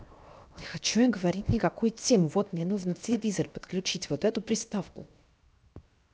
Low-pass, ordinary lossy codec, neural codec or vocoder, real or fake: none; none; codec, 16 kHz, 0.7 kbps, FocalCodec; fake